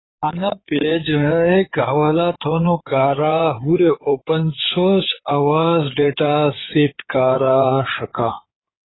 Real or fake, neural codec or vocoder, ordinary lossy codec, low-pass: fake; codec, 16 kHz in and 24 kHz out, 2.2 kbps, FireRedTTS-2 codec; AAC, 16 kbps; 7.2 kHz